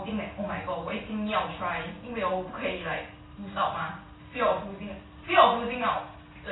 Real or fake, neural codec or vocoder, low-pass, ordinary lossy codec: real; none; 7.2 kHz; AAC, 16 kbps